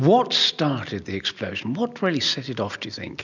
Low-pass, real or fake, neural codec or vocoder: 7.2 kHz; real; none